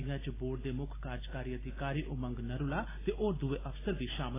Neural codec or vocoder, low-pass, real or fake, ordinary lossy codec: none; 3.6 kHz; real; AAC, 16 kbps